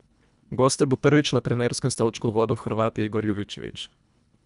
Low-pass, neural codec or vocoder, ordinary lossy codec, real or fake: 10.8 kHz; codec, 24 kHz, 1.5 kbps, HILCodec; none; fake